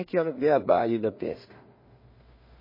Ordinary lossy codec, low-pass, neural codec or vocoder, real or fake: MP3, 24 kbps; 5.4 kHz; codec, 16 kHz in and 24 kHz out, 0.4 kbps, LongCat-Audio-Codec, two codebook decoder; fake